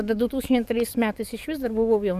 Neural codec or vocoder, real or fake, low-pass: codec, 44.1 kHz, 7.8 kbps, DAC; fake; 14.4 kHz